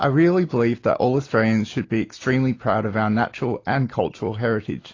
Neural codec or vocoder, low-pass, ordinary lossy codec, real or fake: none; 7.2 kHz; AAC, 32 kbps; real